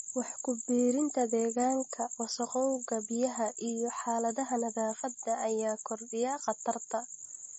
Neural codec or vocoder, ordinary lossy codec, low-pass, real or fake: none; MP3, 32 kbps; 9.9 kHz; real